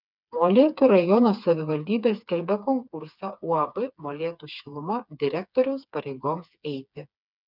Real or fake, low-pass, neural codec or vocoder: fake; 5.4 kHz; codec, 16 kHz, 4 kbps, FreqCodec, smaller model